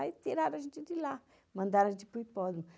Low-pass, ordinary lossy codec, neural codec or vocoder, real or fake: none; none; none; real